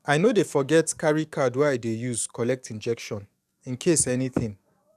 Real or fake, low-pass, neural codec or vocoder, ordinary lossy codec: fake; 14.4 kHz; codec, 44.1 kHz, 7.8 kbps, DAC; none